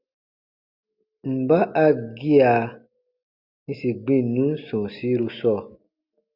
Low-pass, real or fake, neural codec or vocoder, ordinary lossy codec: 5.4 kHz; real; none; Opus, 64 kbps